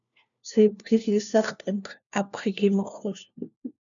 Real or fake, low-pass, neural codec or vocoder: fake; 7.2 kHz; codec, 16 kHz, 1 kbps, FunCodec, trained on LibriTTS, 50 frames a second